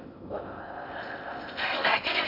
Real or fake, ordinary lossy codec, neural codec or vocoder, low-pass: fake; none; codec, 16 kHz in and 24 kHz out, 0.6 kbps, FocalCodec, streaming, 2048 codes; 5.4 kHz